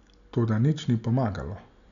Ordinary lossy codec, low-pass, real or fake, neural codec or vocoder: none; 7.2 kHz; real; none